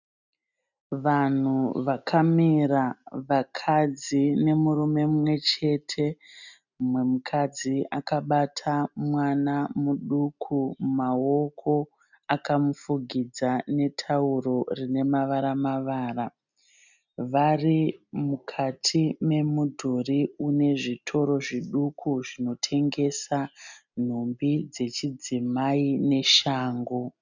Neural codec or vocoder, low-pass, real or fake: none; 7.2 kHz; real